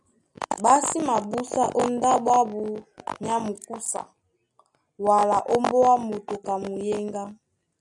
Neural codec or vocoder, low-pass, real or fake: none; 10.8 kHz; real